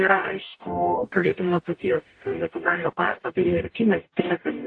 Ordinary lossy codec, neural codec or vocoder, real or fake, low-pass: AAC, 48 kbps; codec, 44.1 kHz, 0.9 kbps, DAC; fake; 9.9 kHz